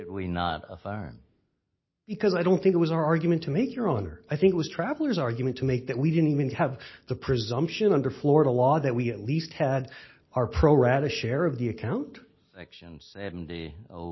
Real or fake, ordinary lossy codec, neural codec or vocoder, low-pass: real; MP3, 24 kbps; none; 7.2 kHz